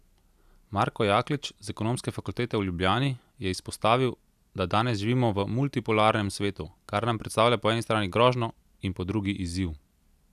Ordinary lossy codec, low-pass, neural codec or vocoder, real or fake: none; 14.4 kHz; none; real